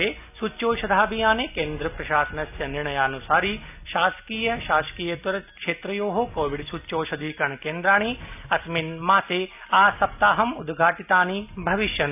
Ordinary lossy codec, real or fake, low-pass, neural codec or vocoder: none; real; 3.6 kHz; none